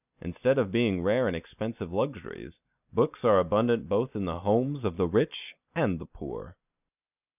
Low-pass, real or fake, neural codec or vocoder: 3.6 kHz; real; none